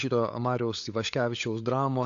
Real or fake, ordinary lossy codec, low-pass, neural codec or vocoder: fake; AAC, 48 kbps; 7.2 kHz; codec, 16 kHz, 16 kbps, FunCodec, trained on LibriTTS, 50 frames a second